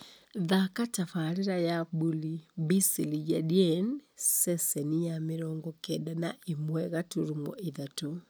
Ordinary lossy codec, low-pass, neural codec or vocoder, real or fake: none; none; none; real